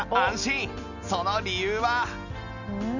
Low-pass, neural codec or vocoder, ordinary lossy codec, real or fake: 7.2 kHz; none; none; real